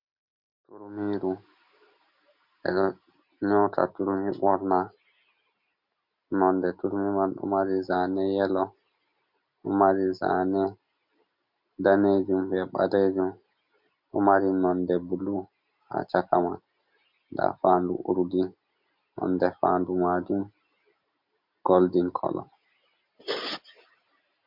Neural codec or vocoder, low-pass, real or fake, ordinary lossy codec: none; 5.4 kHz; real; MP3, 48 kbps